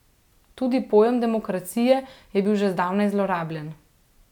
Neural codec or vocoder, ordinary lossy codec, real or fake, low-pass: none; none; real; 19.8 kHz